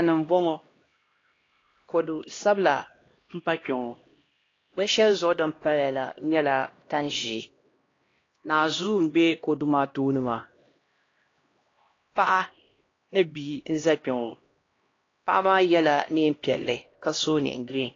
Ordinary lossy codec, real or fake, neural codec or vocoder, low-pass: AAC, 32 kbps; fake; codec, 16 kHz, 1 kbps, X-Codec, HuBERT features, trained on LibriSpeech; 7.2 kHz